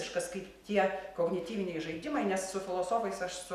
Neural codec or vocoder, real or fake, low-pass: none; real; 14.4 kHz